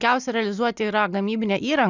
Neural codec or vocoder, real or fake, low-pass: none; real; 7.2 kHz